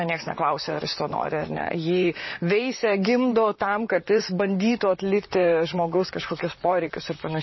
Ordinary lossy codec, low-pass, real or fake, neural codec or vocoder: MP3, 24 kbps; 7.2 kHz; fake; vocoder, 44.1 kHz, 128 mel bands, Pupu-Vocoder